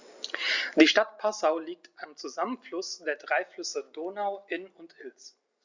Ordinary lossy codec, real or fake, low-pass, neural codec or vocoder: Opus, 64 kbps; real; 7.2 kHz; none